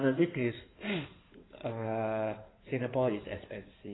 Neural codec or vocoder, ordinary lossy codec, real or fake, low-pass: codec, 16 kHz in and 24 kHz out, 2.2 kbps, FireRedTTS-2 codec; AAC, 16 kbps; fake; 7.2 kHz